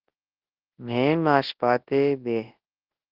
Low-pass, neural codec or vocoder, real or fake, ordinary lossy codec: 5.4 kHz; codec, 24 kHz, 0.9 kbps, WavTokenizer, large speech release; fake; Opus, 16 kbps